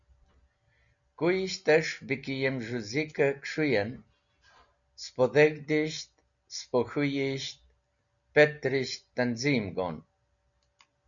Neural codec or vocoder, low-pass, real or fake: none; 7.2 kHz; real